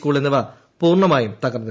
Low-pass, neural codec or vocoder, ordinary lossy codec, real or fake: none; none; none; real